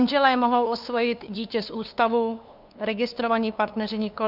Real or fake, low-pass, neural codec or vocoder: fake; 5.4 kHz; codec, 16 kHz, 2 kbps, FunCodec, trained on LibriTTS, 25 frames a second